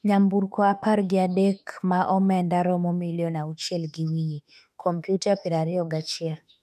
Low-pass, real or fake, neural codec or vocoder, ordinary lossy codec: 14.4 kHz; fake; autoencoder, 48 kHz, 32 numbers a frame, DAC-VAE, trained on Japanese speech; none